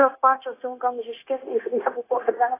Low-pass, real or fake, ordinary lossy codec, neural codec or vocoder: 3.6 kHz; fake; AAC, 16 kbps; codec, 16 kHz, 0.9 kbps, LongCat-Audio-Codec